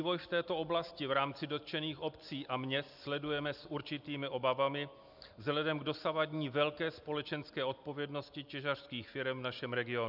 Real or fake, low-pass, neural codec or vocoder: real; 5.4 kHz; none